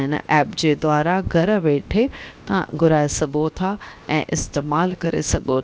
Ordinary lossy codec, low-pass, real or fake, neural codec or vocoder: none; none; fake; codec, 16 kHz, 0.7 kbps, FocalCodec